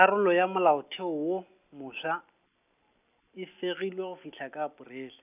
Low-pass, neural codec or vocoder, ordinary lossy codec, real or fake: 3.6 kHz; none; none; real